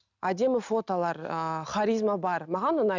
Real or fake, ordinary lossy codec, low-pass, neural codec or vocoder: real; none; 7.2 kHz; none